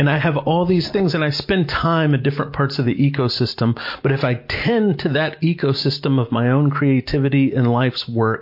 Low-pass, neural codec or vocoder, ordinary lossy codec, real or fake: 5.4 kHz; none; MP3, 32 kbps; real